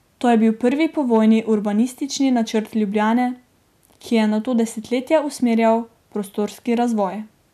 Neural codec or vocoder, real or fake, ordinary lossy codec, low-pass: none; real; none; 14.4 kHz